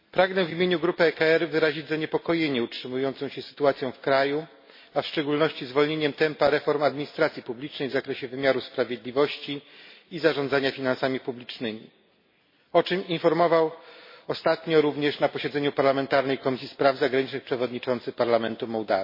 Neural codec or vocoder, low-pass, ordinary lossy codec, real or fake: none; 5.4 kHz; MP3, 24 kbps; real